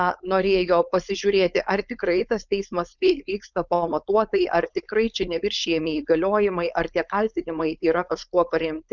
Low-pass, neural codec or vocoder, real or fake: 7.2 kHz; codec, 16 kHz, 4.8 kbps, FACodec; fake